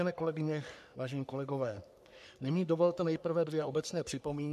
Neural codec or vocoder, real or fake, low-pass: codec, 44.1 kHz, 3.4 kbps, Pupu-Codec; fake; 14.4 kHz